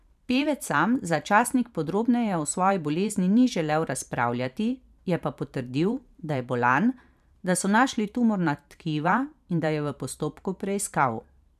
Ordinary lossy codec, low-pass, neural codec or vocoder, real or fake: none; 14.4 kHz; vocoder, 44.1 kHz, 128 mel bands every 512 samples, BigVGAN v2; fake